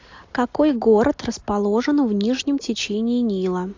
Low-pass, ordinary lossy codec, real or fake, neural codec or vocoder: 7.2 kHz; AAC, 48 kbps; real; none